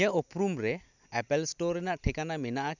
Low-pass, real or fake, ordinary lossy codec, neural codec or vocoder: 7.2 kHz; real; none; none